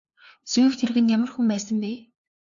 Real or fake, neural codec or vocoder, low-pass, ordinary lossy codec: fake; codec, 16 kHz, 2 kbps, FunCodec, trained on LibriTTS, 25 frames a second; 7.2 kHz; AAC, 64 kbps